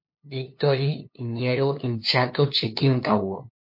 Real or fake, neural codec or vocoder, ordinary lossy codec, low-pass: fake; codec, 16 kHz, 2 kbps, FunCodec, trained on LibriTTS, 25 frames a second; MP3, 32 kbps; 5.4 kHz